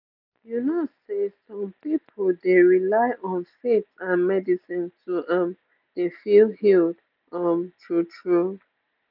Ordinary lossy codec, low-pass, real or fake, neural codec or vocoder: none; 5.4 kHz; real; none